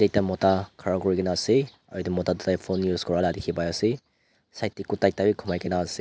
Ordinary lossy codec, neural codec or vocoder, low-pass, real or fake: none; none; none; real